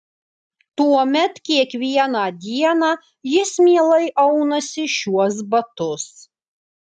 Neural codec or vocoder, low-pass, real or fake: none; 10.8 kHz; real